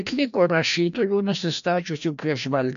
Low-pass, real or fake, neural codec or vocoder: 7.2 kHz; fake; codec, 16 kHz, 1 kbps, FreqCodec, larger model